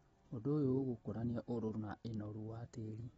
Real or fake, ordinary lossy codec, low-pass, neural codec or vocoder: real; AAC, 24 kbps; 14.4 kHz; none